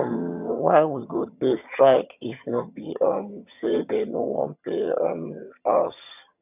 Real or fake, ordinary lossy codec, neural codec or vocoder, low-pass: fake; none; vocoder, 22.05 kHz, 80 mel bands, HiFi-GAN; 3.6 kHz